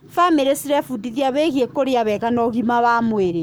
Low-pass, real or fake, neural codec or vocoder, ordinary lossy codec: none; fake; codec, 44.1 kHz, 7.8 kbps, Pupu-Codec; none